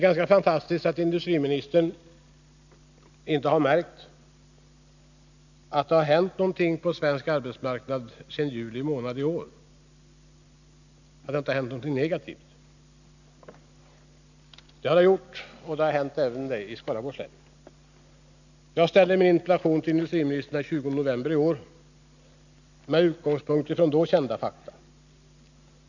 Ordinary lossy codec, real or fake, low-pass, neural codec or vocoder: none; real; 7.2 kHz; none